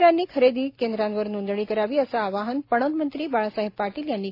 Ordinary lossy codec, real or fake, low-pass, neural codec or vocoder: AAC, 32 kbps; real; 5.4 kHz; none